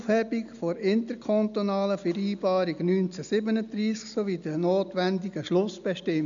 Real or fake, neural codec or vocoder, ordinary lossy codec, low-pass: real; none; MP3, 96 kbps; 7.2 kHz